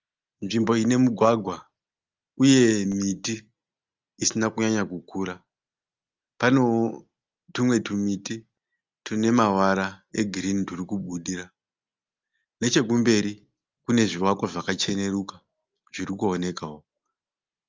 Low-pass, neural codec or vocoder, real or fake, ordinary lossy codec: 7.2 kHz; none; real; Opus, 32 kbps